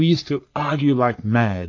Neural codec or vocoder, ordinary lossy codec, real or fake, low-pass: codec, 44.1 kHz, 3.4 kbps, Pupu-Codec; AAC, 48 kbps; fake; 7.2 kHz